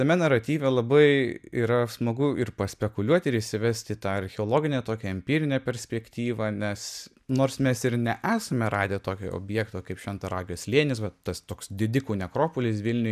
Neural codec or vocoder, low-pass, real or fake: none; 14.4 kHz; real